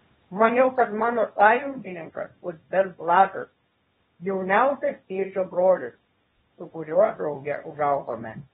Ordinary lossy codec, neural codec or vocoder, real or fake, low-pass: AAC, 16 kbps; codec, 24 kHz, 0.9 kbps, WavTokenizer, small release; fake; 10.8 kHz